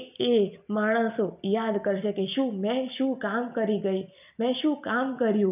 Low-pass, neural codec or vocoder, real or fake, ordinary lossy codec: 3.6 kHz; none; real; none